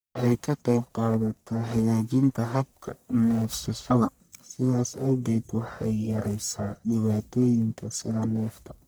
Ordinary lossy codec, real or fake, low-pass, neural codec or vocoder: none; fake; none; codec, 44.1 kHz, 1.7 kbps, Pupu-Codec